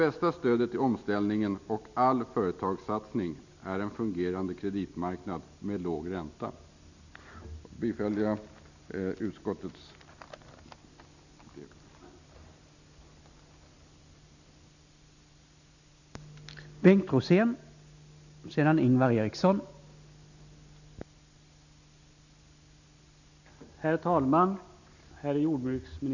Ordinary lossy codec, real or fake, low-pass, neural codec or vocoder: none; real; 7.2 kHz; none